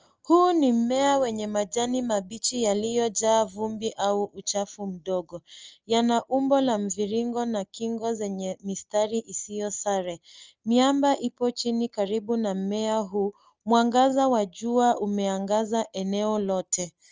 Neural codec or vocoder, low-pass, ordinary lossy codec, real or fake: none; 7.2 kHz; Opus, 24 kbps; real